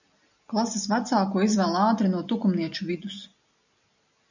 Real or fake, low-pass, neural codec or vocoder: real; 7.2 kHz; none